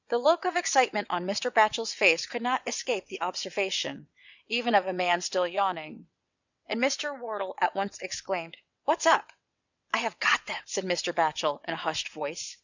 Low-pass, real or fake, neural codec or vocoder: 7.2 kHz; fake; vocoder, 22.05 kHz, 80 mel bands, WaveNeXt